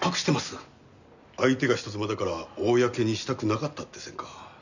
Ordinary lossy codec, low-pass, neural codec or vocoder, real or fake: none; 7.2 kHz; none; real